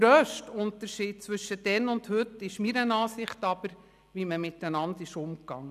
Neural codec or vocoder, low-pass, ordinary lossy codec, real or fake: none; 14.4 kHz; none; real